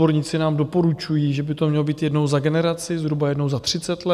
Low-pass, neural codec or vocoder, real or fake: 14.4 kHz; none; real